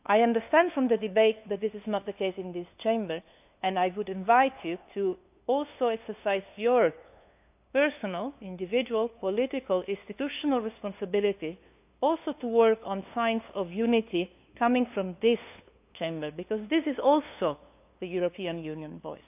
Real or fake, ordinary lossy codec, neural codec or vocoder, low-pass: fake; AAC, 32 kbps; codec, 16 kHz, 2 kbps, FunCodec, trained on LibriTTS, 25 frames a second; 3.6 kHz